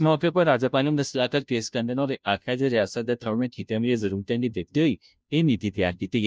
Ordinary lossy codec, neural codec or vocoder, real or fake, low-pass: none; codec, 16 kHz, 0.5 kbps, FunCodec, trained on Chinese and English, 25 frames a second; fake; none